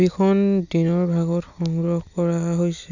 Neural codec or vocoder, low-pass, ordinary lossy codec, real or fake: none; 7.2 kHz; none; real